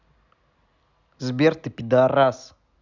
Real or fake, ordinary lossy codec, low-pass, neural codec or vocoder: real; none; 7.2 kHz; none